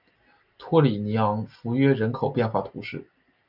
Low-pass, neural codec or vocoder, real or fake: 5.4 kHz; none; real